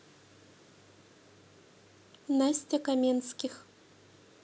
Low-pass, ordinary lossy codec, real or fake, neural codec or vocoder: none; none; real; none